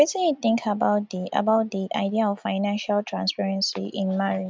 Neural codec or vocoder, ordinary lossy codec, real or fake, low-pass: none; none; real; none